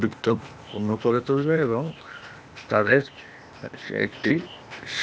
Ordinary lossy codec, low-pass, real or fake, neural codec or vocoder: none; none; fake; codec, 16 kHz, 0.8 kbps, ZipCodec